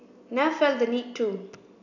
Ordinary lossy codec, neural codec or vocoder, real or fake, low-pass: none; none; real; 7.2 kHz